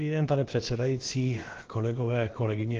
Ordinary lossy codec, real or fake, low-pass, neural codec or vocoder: Opus, 16 kbps; fake; 7.2 kHz; codec, 16 kHz, about 1 kbps, DyCAST, with the encoder's durations